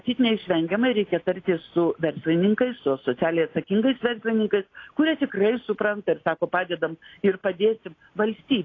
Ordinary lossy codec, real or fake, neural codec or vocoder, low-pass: AAC, 32 kbps; real; none; 7.2 kHz